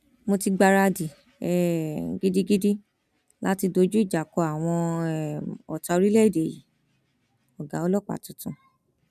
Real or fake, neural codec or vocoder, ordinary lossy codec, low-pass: real; none; none; 14.4 kHz